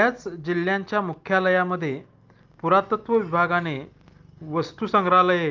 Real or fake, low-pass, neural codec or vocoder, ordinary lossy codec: real; 7.2 kHz; none; Opus, 24 kbps